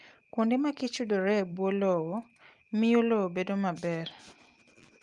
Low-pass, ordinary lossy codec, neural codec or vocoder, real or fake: 10.8 kHz; Opus, 32 kbps; none; real